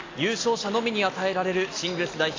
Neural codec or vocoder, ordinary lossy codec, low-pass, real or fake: none; AAC, 32 kbps; 7.2 kHz; real